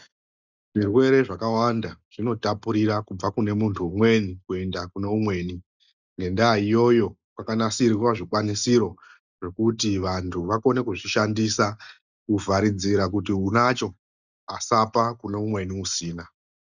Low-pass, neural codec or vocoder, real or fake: 7.2 kHz; none; real